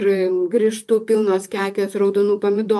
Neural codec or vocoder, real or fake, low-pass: vocoder, 44.1 kHz, 128 mel bands, Pupu-Vocoder; fake; 14.4 kHz